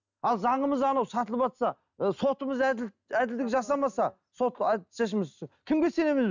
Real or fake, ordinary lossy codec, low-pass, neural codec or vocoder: real; none; 7.2 kHz; none